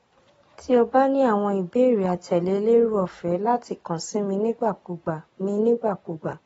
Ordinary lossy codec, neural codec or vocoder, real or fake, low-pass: AAC, 24 kbps; none; real; 19.8 kHz